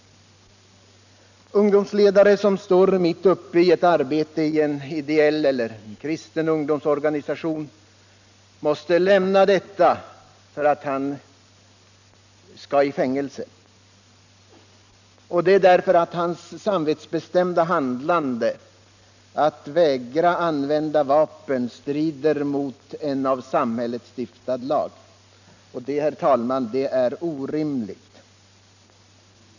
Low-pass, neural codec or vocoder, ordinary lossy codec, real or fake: 7.2 kHz; none; none; real